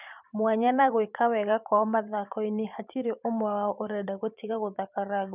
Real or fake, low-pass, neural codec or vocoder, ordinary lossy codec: fake; 3.6 kHz; vocoder, 44.1 kHz, 128 mel bands every 512 samples, BigVGAN v2; none